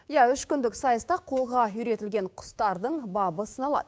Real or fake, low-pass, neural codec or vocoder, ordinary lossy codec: fake; none; codec, 16 kHz, 6 kbps, DAC; none